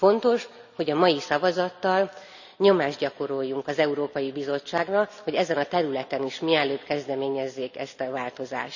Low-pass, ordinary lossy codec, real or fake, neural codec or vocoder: 7.2 kHz; none; real; none